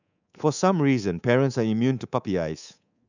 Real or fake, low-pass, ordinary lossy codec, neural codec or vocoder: fake; 7.2 kHz; none; codec, 24 kHz, 3.1 kbps, DualCodec